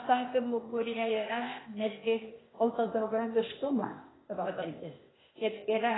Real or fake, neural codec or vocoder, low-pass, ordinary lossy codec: fake; codec, 16 kHz, 0.8 kbps, ZipCodec; 7.2 kHz; AAC, 16 kbps